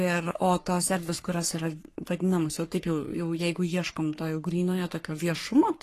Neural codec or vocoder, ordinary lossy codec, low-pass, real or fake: codec, 44.1 kHz, 3.4 kbps, Pupu-Codec; AAC, 48 kbps; 14.4 kHz; fake